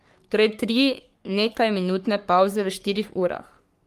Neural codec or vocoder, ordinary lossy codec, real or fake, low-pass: codec, 44.1 kHz, 3.4 kbps, Pupu-Codec; Opus, 32 kbps; fake; 14.4 kHz